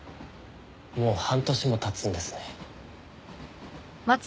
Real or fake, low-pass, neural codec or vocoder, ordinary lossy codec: real; none; none; none